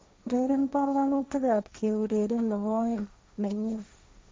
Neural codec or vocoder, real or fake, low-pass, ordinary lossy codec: codec, 16 kHz, 1.1 kbps, Voila-Tokenizer; fake; none; none